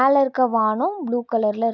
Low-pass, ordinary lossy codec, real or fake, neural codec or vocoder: 7.2 kHz; none; real; none